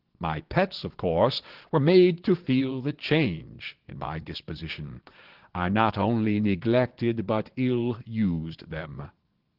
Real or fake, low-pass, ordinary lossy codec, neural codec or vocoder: fake; 5.4 kHz; Opus, 16 kbps; vocoder, 22.05 kHz, 80 mel bands, Vocos